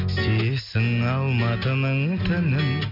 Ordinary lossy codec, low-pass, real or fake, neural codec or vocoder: MP3, 48 kbps; 5.4 kHz; real; none